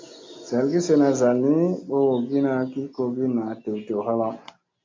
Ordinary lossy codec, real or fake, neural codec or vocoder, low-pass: MP3, 48 kbps; real; none; 7.2 kHz